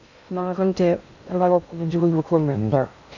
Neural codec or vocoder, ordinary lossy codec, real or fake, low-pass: codec, 16 kHz in and 24 kHz out, 0.6 kbps, FocalCodec, streaming, 2048 codes; none; fake; 7.2 kHz